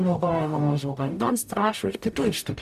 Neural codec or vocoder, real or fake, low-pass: codec, 44.1 kHz, 0.9 kbps, DAC; fake; 14.4 kHz